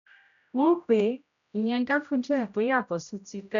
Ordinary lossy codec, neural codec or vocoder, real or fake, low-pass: MP3, 96 kbps; codec, 16 kHz, 0.5 kbps, X-Codec, HuBERT features, trained on general audio; fake; 7.2 kHz